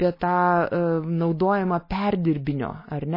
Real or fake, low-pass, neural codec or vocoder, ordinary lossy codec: real; 5.4 kHz; none; MP3, 24 kbps